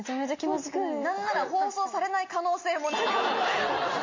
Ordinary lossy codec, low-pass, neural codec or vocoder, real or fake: MP3, 32 kbps; 7.2 kHz; none; real